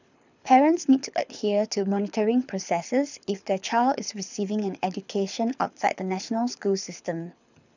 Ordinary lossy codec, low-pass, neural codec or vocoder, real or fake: none; 7.2 kHz; codec, 24 kHz, 6 kbps, HILCodec; fake